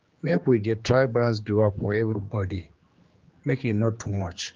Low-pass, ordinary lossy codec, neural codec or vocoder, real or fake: 7.2 kHz; Opus, 24 kbps; codec, 16 kHz, 2 kbps, X-Codec, HuBERT features, trained on general audio; fake